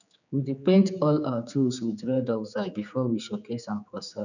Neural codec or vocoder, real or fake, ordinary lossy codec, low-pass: codec, 16 kHz, 4 kbps, X-Codec, HuBERT features, trained on general audio; fake; none; 7.2 kHz